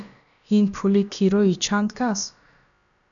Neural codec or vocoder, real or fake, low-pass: codec, 16 kHz, about 1 kbps, DyCAST, with the encoder's durations; fake; 7.2 kHz